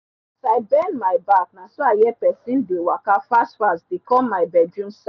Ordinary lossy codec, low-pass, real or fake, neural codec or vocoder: none; 7.2 kHz; real; none